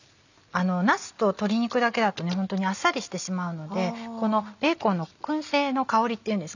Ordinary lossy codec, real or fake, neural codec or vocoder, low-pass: none; real; none; 7.2 kHz